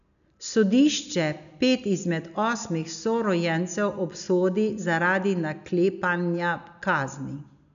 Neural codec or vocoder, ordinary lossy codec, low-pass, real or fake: none; none; 7.2 kHz; real